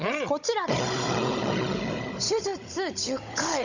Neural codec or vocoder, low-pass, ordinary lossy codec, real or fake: codec, 16 kHz, 16 kbps, FunCodec, trained on Chinese and English, 50 frames a second; 7.2 kHz; none; fake